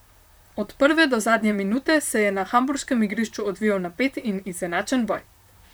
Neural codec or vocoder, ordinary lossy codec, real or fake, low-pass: vocoder, 44.1 kHz, 128 mel bands, Pupu-Vocoder; none; fake; none